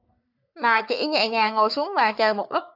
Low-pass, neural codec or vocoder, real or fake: 5.4 kHz; codec, 44.1 kHz, 3.4 kbps, Pupu-Codec; fake